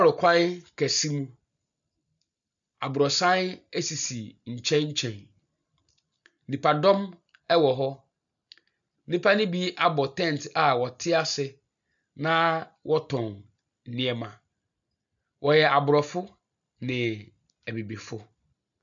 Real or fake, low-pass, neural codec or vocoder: real; 7.2 kHz; none